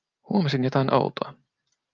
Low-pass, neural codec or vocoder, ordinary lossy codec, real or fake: 7.2 kHz; none; Opus, 24 kbps; real